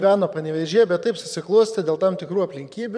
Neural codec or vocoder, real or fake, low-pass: vocoder, 22.05 kHz, 80 mel bands, Vocos; fake; 9.9 kHz